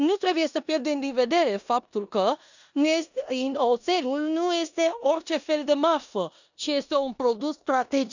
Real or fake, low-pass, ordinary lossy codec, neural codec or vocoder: fake; 7.2 kHz; none; codec, 16 kHz in and 24 kHz out, 0.9 kbps, LongCat-Audio-Codec, four codebook decoder